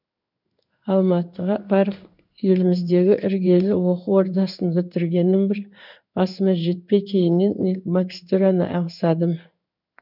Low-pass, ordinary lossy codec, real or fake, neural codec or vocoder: 5.4 kHz; none; fake; codec, 16 kHz in and 24 kHz out, 1 kbps, XY-Tokenizer